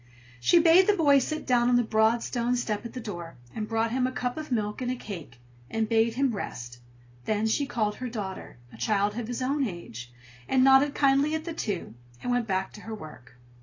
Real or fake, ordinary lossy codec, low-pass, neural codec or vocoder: real; AAC, 32 kbps; 7.2 kHz; none